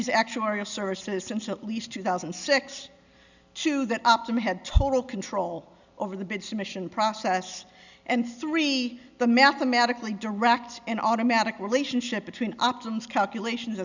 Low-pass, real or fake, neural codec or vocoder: 7.2 kHz; real; none